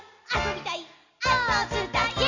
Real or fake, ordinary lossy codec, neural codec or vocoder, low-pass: real; none; none; 7.2 kHz